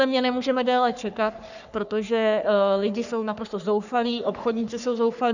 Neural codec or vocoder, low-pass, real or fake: codec, 44.1 kHz, 3.4 kbps, Pupu-Codec; 7.2 kHz; fake